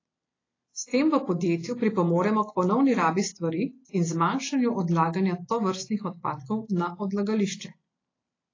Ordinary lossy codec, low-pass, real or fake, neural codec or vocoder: AAC, 32 kbps; 7.2 kHz; real; none